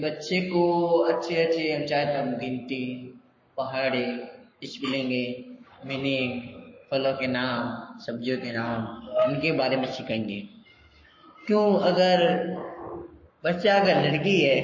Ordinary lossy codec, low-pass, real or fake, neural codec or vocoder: MP3, 32 kbps; 7.2 kHz; fake; codec, 44.1 kHz, 7.8 kbps, Pupu-Codec